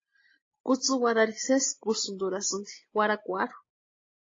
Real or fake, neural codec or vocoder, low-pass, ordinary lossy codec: real; none; 7.2 kHz; AAC, 32 kbps